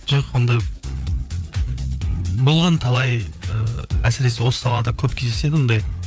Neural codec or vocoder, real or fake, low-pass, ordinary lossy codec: codec, 16 kHz, 4 kbps, FreqCodec, larger model; fake; none; none